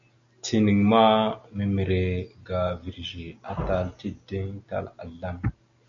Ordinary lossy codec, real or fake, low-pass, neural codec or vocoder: MP3, 64 kbps; real; 7.2 kHz; none